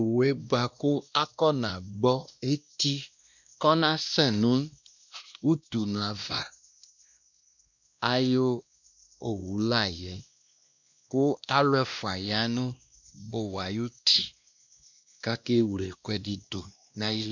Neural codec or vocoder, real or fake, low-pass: codec, 16 kHz, 1 kbps, X-Codec, WavLM features, trained on Multilingual LibriSpeech; fake; 7.2 kHz